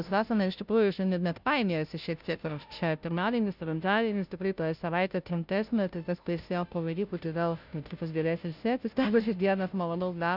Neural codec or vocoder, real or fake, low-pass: codec, 16 kHz, 0.5 kbps, FunCodec, trained on Chinese and English, 25 frames a second; fake; 5.4 kHz